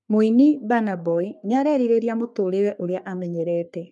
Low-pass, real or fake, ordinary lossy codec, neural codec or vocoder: 10.8 kHz; fake; MP3, 96 kbps; codec, 44.1 kHz, 3.4 kbps, Pupu-Codec